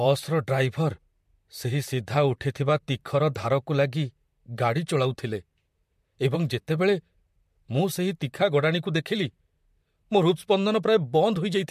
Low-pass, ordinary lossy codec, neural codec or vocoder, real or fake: 14.4 kHz; MP3, 64 kbps; vocoder, 44.1 kHz, 128 mel bands, Pupu-Vocoder; fake